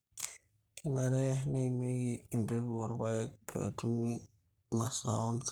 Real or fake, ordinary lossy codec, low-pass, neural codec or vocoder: fake; none; none; codec, 44.1 kHz, 3.4 kbps, Pupu-Codec